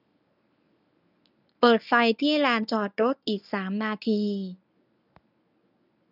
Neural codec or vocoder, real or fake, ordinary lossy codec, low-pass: codec, 24 kHz, 0.9 kbps, WavTokenizer, medium speech release version 1; fake; none; 5.4 kHz